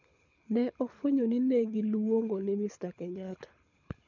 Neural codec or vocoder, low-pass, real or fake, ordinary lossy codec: codec, 24 kHz, 6 kbps, HILCodec; 7.2 kHz; fake; none